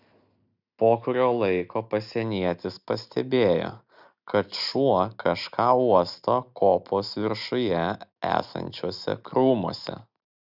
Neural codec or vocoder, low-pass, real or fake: none; 5.4 kHz; real